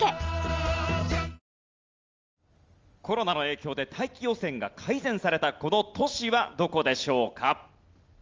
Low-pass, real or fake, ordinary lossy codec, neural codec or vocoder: 7.2 kHz; real; Opus, 32 kbps; none